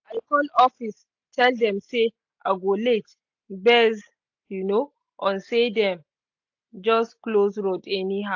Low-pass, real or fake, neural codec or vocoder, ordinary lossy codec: 7.2 kHz; real; none; AAC, 48 kbps